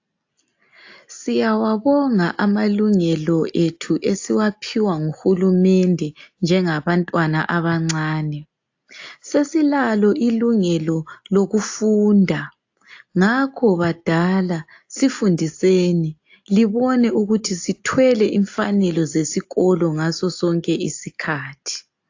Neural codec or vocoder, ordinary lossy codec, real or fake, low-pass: none; AAC, 48 kbps; real; 7.2 kHz